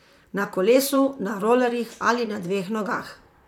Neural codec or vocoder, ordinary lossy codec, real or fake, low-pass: vocoder, 44.1 kHz, 128 mel bands, Pupu-Vocoder; none; fake; 19.8 kHz